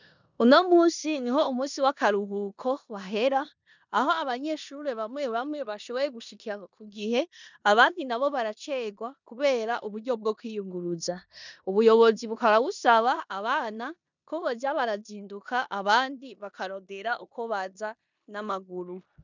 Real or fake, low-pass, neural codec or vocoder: fake; 7.2 kHz; codec, 16 kHz in and 24 kHz out, 0.9 kbps, LongCat-Audio-Codec, four codebook decoder